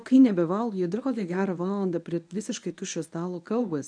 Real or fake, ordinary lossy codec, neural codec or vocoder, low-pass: fake; MP3, 96 kbps; codec, 24 kHz, 0.9 kbps, WavTokenizer, medium speech release version 1; 9.9 kHz